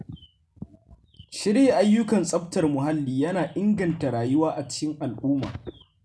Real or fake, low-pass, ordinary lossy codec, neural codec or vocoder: real; none; none; none